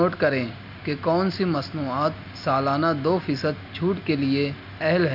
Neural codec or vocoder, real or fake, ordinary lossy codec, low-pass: none; real; Opus, 64 kbps; 5.4 kHz